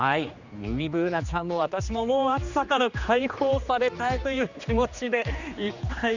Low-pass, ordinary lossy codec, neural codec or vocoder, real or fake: 7.2 kHz; Opus, 64 kbps; codec, 16 kHz, 2 kbps, X-Codec, HuBERT features, trained on general audio; fake